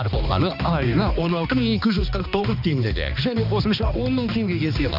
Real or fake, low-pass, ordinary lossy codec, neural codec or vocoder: fake; 5.4 kHz; none; codec, 16 kHz, 2 kbps, X-Codec, HuBERT features, trained on balanced general audio